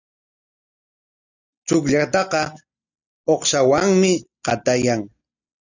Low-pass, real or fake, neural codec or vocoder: 7.2 kHz; real; none